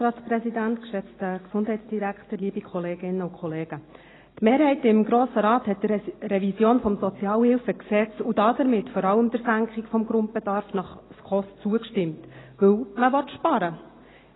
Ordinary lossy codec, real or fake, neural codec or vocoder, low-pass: AAC, 16 kbps; real; none; 7.2 kHz